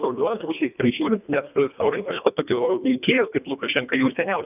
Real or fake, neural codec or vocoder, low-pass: fake; codec, 24 kHz, 1.5 kbps, HILCodec; 3.6 kHz